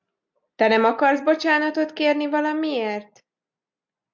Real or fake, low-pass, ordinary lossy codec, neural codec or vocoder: real; 7.2 kHz; MP3, 64 kbps; none